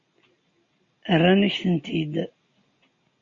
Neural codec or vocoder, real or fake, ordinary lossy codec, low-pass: none; real; MP3, 32 kbps; 7.2 kHz